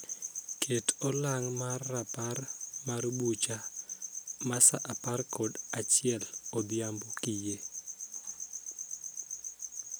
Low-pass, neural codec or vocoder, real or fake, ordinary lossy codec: none; none; real; none